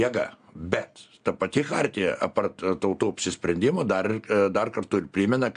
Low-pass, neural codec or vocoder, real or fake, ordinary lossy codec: 9.9 kHz; none; real; AAC, 96 kbps